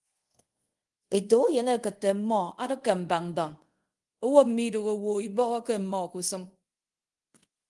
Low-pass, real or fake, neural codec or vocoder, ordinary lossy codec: 10.8 kHz; fake; codec, 24 kHz, 0.5 kbps, DualCodec; Opus, 24 kbps